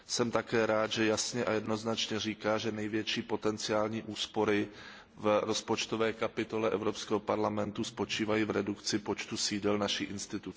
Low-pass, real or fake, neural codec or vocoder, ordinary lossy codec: none; real; none; none